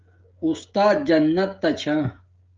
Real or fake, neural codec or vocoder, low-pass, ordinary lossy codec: fake; codec, 16 kHz, 16 kbps, FreqCodec, smaller model; 7.2 kHz; Opus, 24 kbps